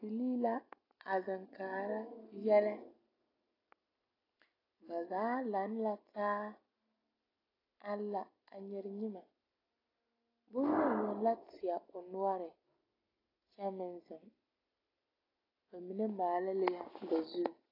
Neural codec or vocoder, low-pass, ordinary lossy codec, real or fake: none; 5.4 kHz; AAC, 24 kbps; real